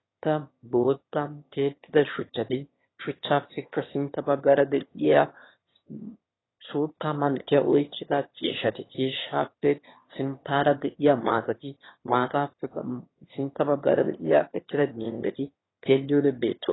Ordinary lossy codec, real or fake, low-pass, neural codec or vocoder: AAC, 16 kbps; fake; 7.2 kHz; autoencoder, 22.05 kHz, a latent of 192 numbers a frame, VITS, trained on one speaker